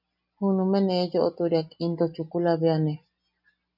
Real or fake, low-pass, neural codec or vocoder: real; 5.4 kHz; none